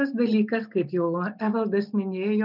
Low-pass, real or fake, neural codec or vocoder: 5.4 kHz; real; none